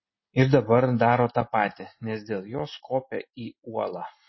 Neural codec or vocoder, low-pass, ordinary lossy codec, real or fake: none; 7.2 kHz; MP3, 24 kbps; real